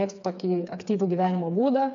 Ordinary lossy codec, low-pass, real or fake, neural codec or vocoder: AAC, 64 kbps; 7.2 kHz; fake; codec, 16 kHz, 4 kbps, FreqCodec, smaller model